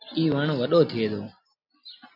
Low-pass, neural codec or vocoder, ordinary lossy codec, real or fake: 5.4 kHz; none; AAC, 48 kbps; real